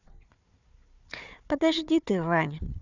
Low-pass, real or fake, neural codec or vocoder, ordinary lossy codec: 7.2 kHz; fake; codec, 16 kHz, 4 kbps, FunCodec, trained on Chinese and English, 50 frames a second; none